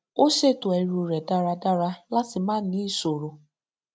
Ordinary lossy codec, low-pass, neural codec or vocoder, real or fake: none; none; none; real